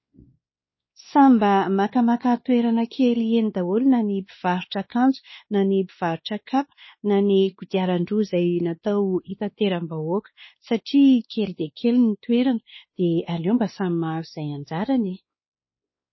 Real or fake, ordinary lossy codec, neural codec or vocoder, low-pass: fake; MP3, 24 kbps; codec, 16 kHz, 2 kbps, X-Codec, WavLM features, trained on Multilingual LibriSpeech; 7.2 kHz